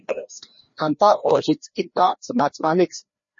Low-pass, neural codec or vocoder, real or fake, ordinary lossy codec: 7.2 kHz; codec, 16 kHz, 1 kbps, FreqCodec, larger model; fake; MP3, 32 kbps